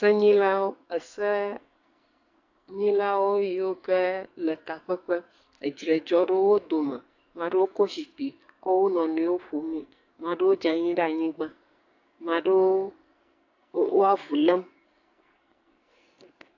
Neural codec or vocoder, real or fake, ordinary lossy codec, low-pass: codec, 44.1 kHz, 2.6 kbps, SNAC; fake; AAC, 48 kbps; 7.2 kHz